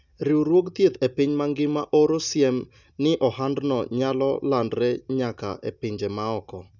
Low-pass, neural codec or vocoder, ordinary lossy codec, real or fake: 7.2 kHz; none; none; real